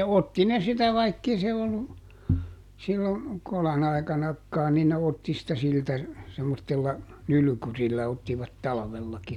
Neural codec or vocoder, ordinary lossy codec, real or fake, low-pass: none; none; real; 19.8 kHz